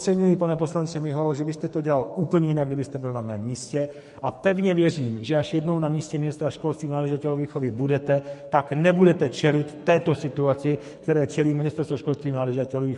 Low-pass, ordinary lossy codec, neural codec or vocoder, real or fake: 14.4 kHz; MP3, 48 kbps; codec, 44.1 kHz, 2.6 kbps, SNAC; fake